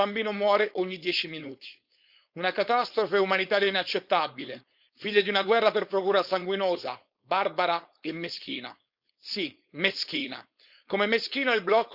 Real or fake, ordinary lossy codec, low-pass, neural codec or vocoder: fake; Opus, 64 kbps; 5.4 kHz; codec, 16 kHz, 4.8 kbps, FACodec